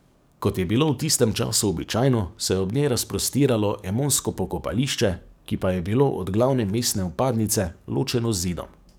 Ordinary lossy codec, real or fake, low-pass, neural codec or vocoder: none; fake; none; codec, 44.1 kHz, 7.8 kbps, DAC